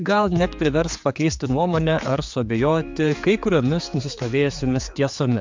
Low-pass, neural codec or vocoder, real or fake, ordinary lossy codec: 7.2 kHz; codec, 16 kHz, 4 kbps, X-Codec, HuBERT features, trained on general audio; fake; MP3, 64 kbps